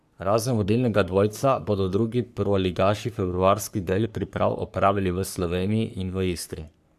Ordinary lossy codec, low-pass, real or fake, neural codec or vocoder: none; 14.4 kHz; fake; codec, 44.1 kHz, 3.4 kbps, Pupu-Codec